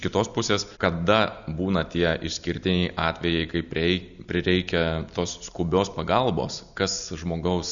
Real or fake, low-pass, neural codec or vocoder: real; 7.2 kHz; none